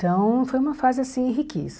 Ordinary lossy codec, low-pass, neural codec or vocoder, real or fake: none; none; none; real